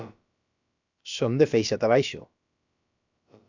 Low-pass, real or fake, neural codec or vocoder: 7.2 kHz; fake; codec, 16 kHz, about 1 kbps, DyCAST, with the encoder's durations